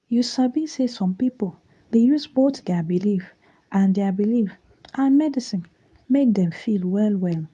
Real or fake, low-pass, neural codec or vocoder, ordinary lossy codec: fake; none; codec, 24 kHz, 0.9 kbps, WavTokenizer, medium speech release version 2; none